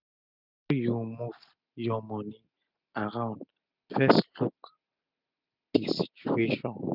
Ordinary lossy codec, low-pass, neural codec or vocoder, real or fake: none; 5.4 kHz; none; real